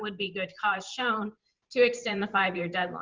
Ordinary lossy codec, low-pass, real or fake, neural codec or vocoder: Opus, 32 kbps; 7.2 kHz; fake; vocoder, 44.1 kHz, 128 mel bands every 512 samples, BigVGAN v2